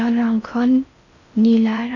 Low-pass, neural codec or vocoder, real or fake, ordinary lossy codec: 7.2 kHz; codec, 16 kHz in and 24 kHz out, 0.6 kbps, FocalCodec, streaming, 4096 codes; fake; none